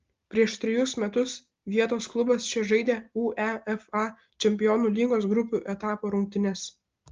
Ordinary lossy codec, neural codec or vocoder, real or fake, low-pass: Opus, 32 kbps; none; real; 7.2 kHz